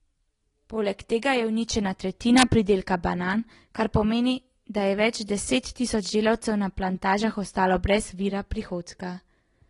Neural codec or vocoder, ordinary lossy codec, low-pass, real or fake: none; AAC, 32 kbps; 10.8 kHz; real